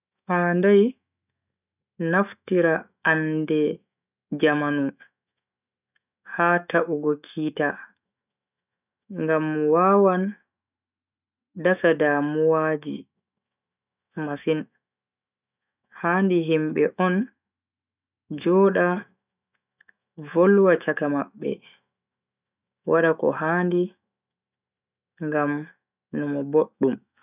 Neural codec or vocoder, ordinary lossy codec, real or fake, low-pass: autoencoder, 48 kHz, 128 numbers a frame, DAC-VAE, trained on Japanese speech; none; fake; 3.6 kHz